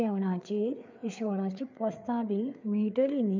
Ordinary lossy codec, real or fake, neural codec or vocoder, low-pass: MP3, 64 kbps; fake; codec, 16 kHz, 4 kbps, FreqCodec, larger model; 7.2 kHz